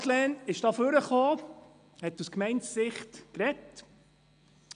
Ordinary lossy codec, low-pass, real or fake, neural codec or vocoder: none; 9.9 kHz; real; none